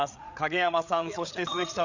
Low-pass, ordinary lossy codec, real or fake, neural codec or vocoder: 7.2 kHz; none; fake; codec, 16 kHz, 8 kbps, FreqCodec, larger model